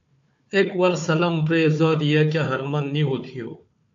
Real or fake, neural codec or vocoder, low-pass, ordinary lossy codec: fake; codec, 16 kHz, 4 kbps, FunCodec, trained on Chinese and English, 50 frames a second; 7.2 kHz; AAC, 64 kbps